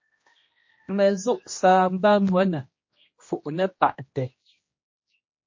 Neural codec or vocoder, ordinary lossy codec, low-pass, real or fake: codec, 16 kHz, 1 kbps, X-Codec, HuBERT features, trained on general audio; MP3, 32 kbps; 7.2 kHz; fake